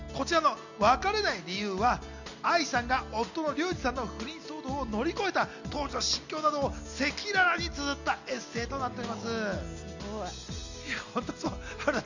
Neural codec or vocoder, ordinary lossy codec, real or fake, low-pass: none; none; real; 7.2 kHz